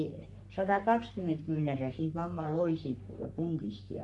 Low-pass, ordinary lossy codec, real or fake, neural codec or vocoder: 10.8 kHz; MP3, 64 kbps; fake; codec, 44.1 kHz, 3.4 kbps, Pupu-Codec